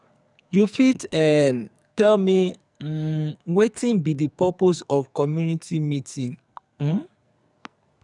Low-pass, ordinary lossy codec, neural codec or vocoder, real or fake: 10.8 kHz; none; codec, 44.1 kHz, 2.6 kbps, SNAC; fake